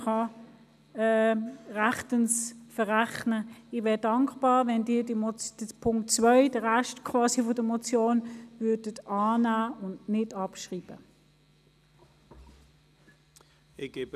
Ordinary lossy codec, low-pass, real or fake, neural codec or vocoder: none; 14.4 kHz; real; none